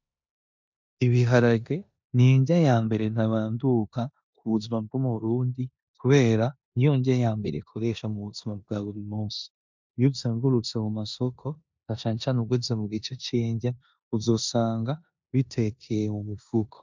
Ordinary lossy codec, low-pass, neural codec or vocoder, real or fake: MP3, 64 kbps; 7.2 kHz; codec, 16 kHz in and 24 kHz out, 0.9 kbps, LongCat-Audio-Codec, four codebook decoder; fake